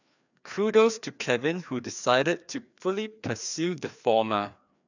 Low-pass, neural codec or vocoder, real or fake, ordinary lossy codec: 7.2 kHz; codec, 16 kHz, 2 kbps, FreqCodec, larger model; fake; none